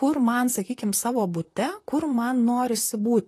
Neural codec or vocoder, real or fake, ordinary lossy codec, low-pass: vocoder, 44.1 kHz, 128 mel bands, Pupu-Vocoder; fake; AAC, 48 kbps; 14.4 kHz